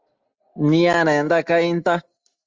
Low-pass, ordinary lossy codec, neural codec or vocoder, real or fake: 7.2 kHz; Opus, 32 kbps; none; real